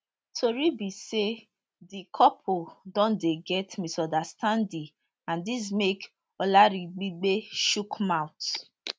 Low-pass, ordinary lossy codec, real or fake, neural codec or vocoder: none; none; real; none